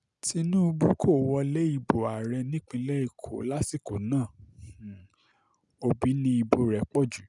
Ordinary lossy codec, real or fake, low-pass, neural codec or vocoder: none; real; 10.8 kHz; none